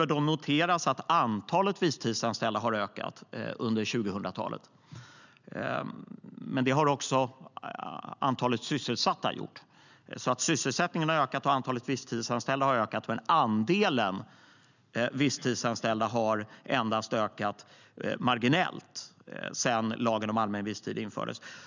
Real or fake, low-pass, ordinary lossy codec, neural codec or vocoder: real; 7.2 kHz; none; none